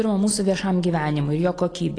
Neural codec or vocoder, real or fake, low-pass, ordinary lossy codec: none; real; 9.9 kHz; AAC, 32 kbps